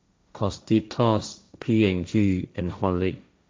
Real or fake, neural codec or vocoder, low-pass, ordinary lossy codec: fake; codec, 16 kHz, 1.1 kbps, Voila-Tokenizer; none; none